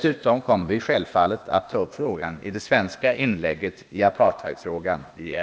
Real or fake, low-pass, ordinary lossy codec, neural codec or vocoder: fake; none; none; codec, 16 kHz, 0.8 kbps, ZipCodec